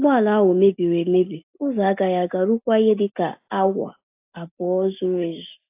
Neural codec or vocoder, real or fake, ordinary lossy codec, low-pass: none; real; AAC, 32 kbps; 3.6 kHz